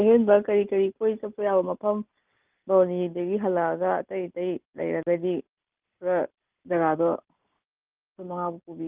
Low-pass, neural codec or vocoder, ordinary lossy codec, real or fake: 3.6 kHz; none; Opus, 32 kbps; real